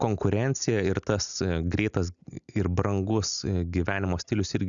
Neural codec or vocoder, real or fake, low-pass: none; real; 7.2 kHz